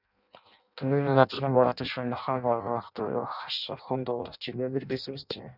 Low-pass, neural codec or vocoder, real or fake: 5.4 kHz; codec, 16 kHz in and 24 kHz out, 0.6 kbps, FireRedTTS-2 codec; fake